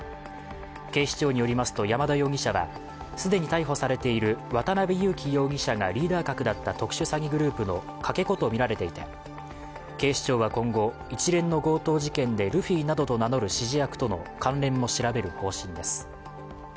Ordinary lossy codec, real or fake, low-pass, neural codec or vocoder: none; real; none; none